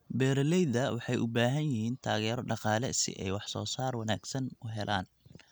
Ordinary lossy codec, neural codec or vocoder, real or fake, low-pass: none; none; real; none